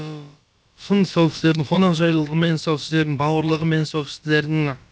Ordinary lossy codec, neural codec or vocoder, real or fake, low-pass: none; codec, 16 kHz, about 1 kbps, DyCAST, with the encoder's durations; fake; none